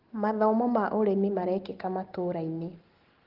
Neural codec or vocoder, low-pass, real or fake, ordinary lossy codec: none; 5.4 kHz; real; Opus, 16 kbps